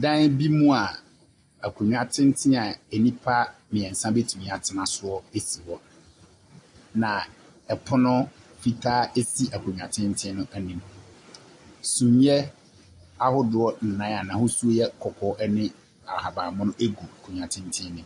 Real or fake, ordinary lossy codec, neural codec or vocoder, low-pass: real; AAC, 64 kbps; none; 10.8 kHz